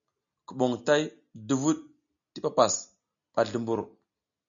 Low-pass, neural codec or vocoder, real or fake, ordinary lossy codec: 7.2 kHz; none; real; MP3, 96 kbps